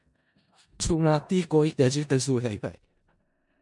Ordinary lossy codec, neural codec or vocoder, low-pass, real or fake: MP3, 96 kbps; codec, 16 kHz in and 24 kHz out, 0.4 kbps, LongCat-Audio-Codec, four codebook decoder; 10.8 kHz; fake